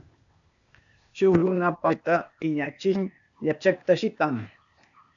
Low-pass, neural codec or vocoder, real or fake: 7.2 kHz; codec, 16 kHz, 0.8 kbps, ZipCodec; fake